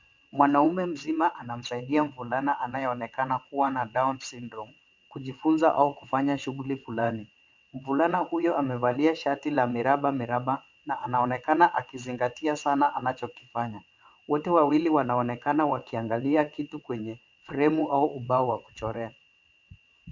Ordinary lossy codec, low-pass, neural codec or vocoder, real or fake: MP3, 64 kbps; 7.2 kHz; vocoder, 22.05 kHz, 80 mel bands, WaveNeXt; fake